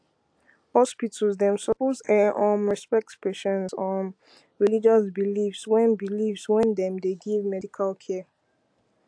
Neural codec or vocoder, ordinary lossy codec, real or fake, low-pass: none; AAC, 64 kbps; real; 9.9 kHz